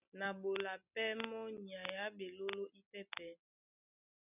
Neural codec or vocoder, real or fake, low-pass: none; real; 3.6 kHz